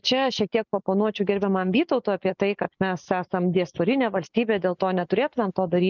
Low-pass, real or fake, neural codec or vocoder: 7.2 kHz; real; none